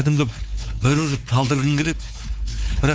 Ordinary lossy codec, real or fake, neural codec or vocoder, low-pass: none; fake; codec, 16 kHz, 4 kbps, X-Codec, WavLM features, trained on Multilingual LibriSpeech; none